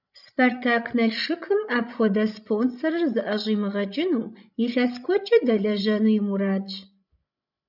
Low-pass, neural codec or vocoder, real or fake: 5.4 kHz; codec, 16 kHz, 16 kbps, FreqCodec, larger model; fake